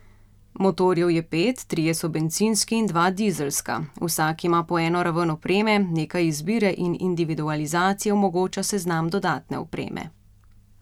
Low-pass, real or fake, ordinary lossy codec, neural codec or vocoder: 19.8 kHz; real; none; none